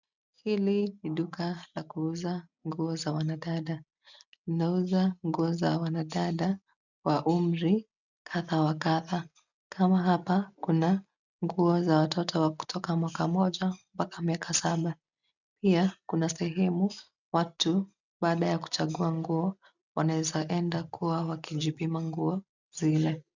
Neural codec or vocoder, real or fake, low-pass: none; real; 7.2 kHz